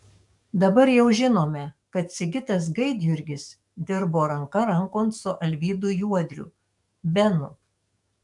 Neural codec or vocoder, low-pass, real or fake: codec, 44.1 kHz, 7.8 kbps, DAC; 10.8 kHz; fake